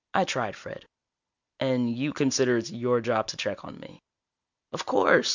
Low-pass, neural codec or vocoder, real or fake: 7.2 kHz; none; real